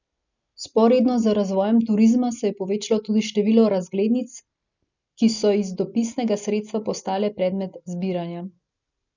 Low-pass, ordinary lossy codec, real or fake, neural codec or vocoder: 7.2 kHz; none; real; none